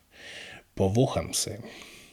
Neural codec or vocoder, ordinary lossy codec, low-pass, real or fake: vocoder, 44.1 kHz, 128 mel bands every 512 samples, BigVGAN v2; none; 19.8 kHz; fake